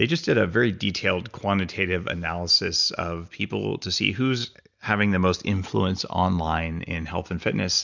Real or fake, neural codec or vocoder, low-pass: real; none; 7.2 kHz